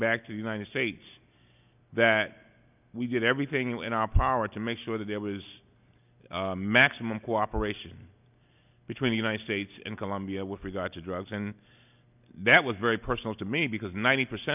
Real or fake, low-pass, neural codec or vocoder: real; 3.6 kHz; none